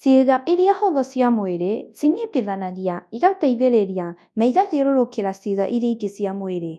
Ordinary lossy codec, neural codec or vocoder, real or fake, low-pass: none; codec, 24 kHz, 0.9 kbps, WavTokenizer, large speech release; fake; none